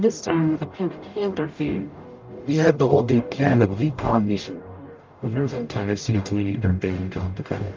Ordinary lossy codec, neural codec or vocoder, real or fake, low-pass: Opus, 24 kbps; codec, 44.1 kHz, 0.9 kbps, DAC; fake; 7.2 kHz